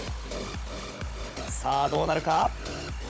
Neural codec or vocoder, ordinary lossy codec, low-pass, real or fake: codec, 16 kHz, 16 kbps, FunCodec, trained on Chinese and English, 50 frames a second; none; none; fake